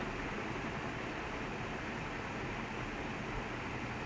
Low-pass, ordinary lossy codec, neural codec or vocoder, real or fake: none; none; none; real